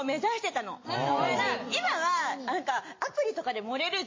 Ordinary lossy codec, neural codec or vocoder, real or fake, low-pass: MP3, 32 kbps; none; real; 7.2 kHz